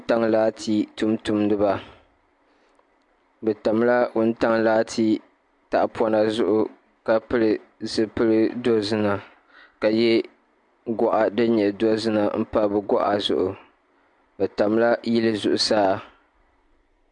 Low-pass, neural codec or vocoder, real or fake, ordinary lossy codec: 10.8 kHz; none; real; MP3, 64 kbps